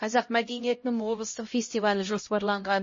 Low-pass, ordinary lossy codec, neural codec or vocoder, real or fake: 7.2 kHz; MP3, 32 kbps; codec, 16 kHz, 0.5 kbps, X-Codec, HuBERT features, trained on LibriSpeech; fake